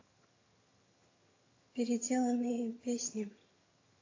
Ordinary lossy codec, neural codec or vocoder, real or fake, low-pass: AAC, 32 kbps; vocoder, 22.05 kHz, 80 mel bands, HiFi-GAN; fake; 7.2 kHz